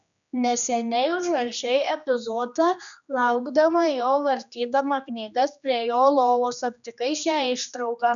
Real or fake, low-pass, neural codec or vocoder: fake; 7.2 kHz; codec, 16 kHz, 2 kbps, X-Codec, HuBERT features, trained on general audio